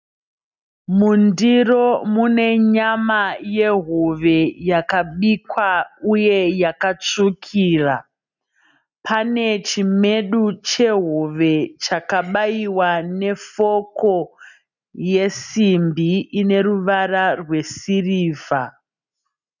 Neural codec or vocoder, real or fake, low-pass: none; real; 7.2 kHz